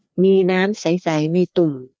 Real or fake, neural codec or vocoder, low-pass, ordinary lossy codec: fake; codec, 16 kHz, 2 kbps, FreqCodec, larger model; none; none